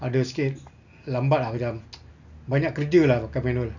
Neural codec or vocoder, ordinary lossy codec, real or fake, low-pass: none; none; real; 7.2 kHz